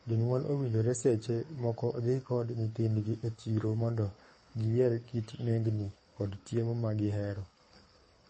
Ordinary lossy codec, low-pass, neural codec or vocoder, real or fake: MP3, 32 kbps; 9.9 kHz; codec, 24 kHz, 6 kbps, HILCodec; fake